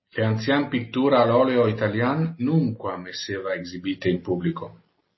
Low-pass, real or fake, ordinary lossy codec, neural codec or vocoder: 7.2 kHz; real; MP3, 24 kbps; none